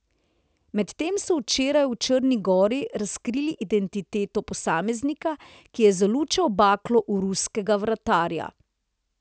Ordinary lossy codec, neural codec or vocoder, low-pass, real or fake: none; none; none; real